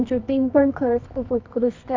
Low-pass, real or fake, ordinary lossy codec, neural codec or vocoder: 7.2 kHz; fake; MP3, 64 kbps; codec, 24 kHz, 0.9 kbps, WavTokenizer, medium music audio release